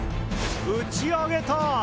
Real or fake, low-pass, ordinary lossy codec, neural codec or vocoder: real; none; none; none